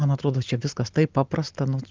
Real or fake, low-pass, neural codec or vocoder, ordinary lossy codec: real; 7.2 kHz; none; Opus, 24 kbps